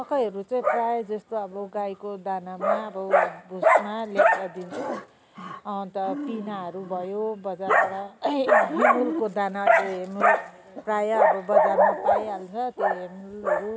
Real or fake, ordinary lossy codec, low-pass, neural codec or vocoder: real; none; none; none